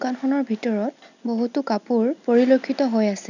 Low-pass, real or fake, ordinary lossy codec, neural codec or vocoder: 7.2 kHz; real; none; none